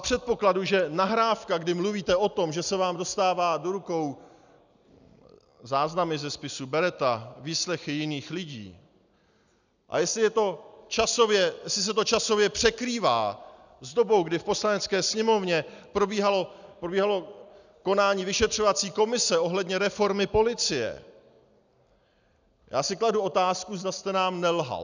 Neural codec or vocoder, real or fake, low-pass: none; real; 7.2 kHz